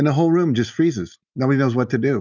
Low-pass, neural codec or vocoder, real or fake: 7.2 kHz; none; real